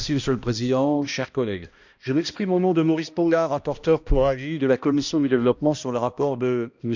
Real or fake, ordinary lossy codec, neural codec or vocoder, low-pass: fake; none; codec, 16 kHz, 1 kbps, X-Codec, HuBERT features, trained on balanced general audio; 7.2 kHz